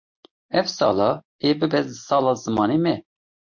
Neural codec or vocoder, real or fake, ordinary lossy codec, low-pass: none; real; MP3, 48 kbps; 7.2 kHz